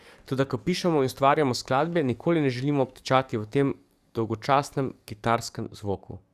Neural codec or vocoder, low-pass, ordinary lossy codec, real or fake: codec, 44.1 kHz, 7.8 kbps, DAC; 14.4 kHz; Opus, 64 kbps; fake